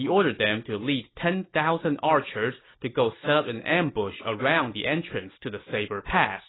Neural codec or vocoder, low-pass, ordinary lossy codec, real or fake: none; 7.2 kHz; AAC, 16 kbps; real